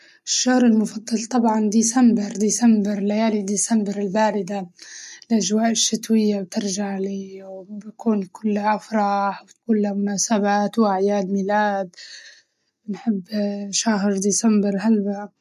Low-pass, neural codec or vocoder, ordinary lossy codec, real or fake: 14.4 kHz; none; MP3, 64 kbps; real